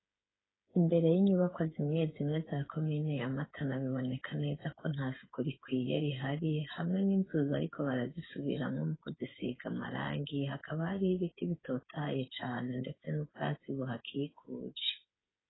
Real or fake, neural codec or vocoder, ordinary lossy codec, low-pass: fake; codec, 16 kHz, 8 kbps, FreqCodec, smaller model; AAC, 16 kbps; 7.2 kHz